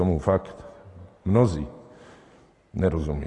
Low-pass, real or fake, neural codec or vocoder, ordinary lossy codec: 10.8 kHz; real; none; AAC, 32 kbps